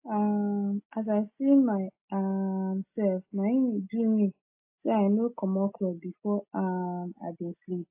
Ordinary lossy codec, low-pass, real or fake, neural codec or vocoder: AAC, 24 kbps; 3.6 kHz; real; none